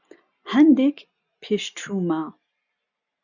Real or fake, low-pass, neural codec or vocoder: real; 7.2 kHz; none